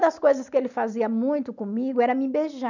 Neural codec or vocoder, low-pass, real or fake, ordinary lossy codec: none; 7.2 kHz; real; none